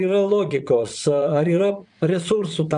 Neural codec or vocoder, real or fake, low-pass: vocoder, 22.05 kHz, 80 mel bands, Vocos; fake; 9.9 kHz